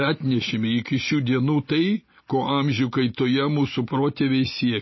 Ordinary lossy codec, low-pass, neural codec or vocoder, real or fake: MP3, 24 kbps; 7.2 kHz; none; real